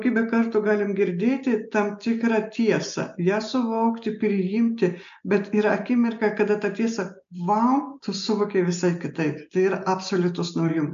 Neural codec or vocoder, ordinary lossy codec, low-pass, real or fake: none; MP3, 64 kbps; 7.2 kHz; real